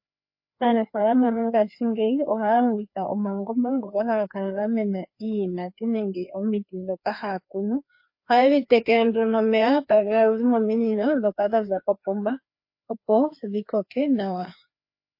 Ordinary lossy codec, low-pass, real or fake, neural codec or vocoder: MP3, 32 kbps; 5.4 kHz; fake; codec, 16 kHz, 2 kbps, FreqCodec, larger model